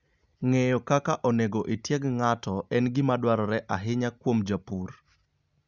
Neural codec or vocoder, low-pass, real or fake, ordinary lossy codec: none; 7.2 kHz; real; Opus, 64 kbps